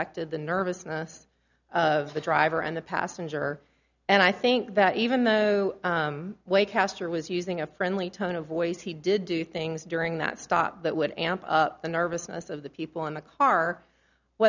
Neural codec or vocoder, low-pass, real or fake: none; 7.2 kHz; real